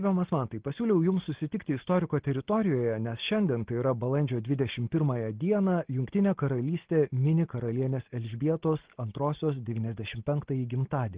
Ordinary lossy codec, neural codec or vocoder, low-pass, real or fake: Opus, 16 kbps; none; 3.6 kHz; real